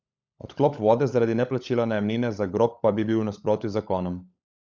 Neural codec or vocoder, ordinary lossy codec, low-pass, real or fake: codec, 16 kHz, 16 kbps, FunCodec, trained on LibriTTS, 50 frames a second; none; none; fake